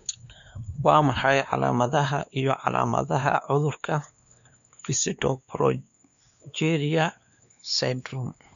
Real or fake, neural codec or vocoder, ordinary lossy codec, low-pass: fake; codec, 16 kHz, 2 kbps, X-Codec, WavLM features, trained on Multilingual LibriSpeech; none; 7.2 kHz